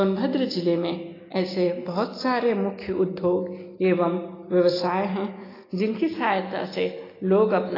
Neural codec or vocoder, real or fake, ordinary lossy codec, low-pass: none; real; AAC, 24 kbps; 5.4 kHz